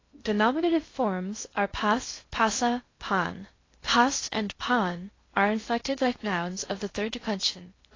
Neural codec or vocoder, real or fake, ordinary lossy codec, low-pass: codec, 16 kHz in and 24 kHz out, 0.6 kbps, FocalCodec, streaming, 2048 codes; fake; AAC, 32 kbps; 7.2 kHz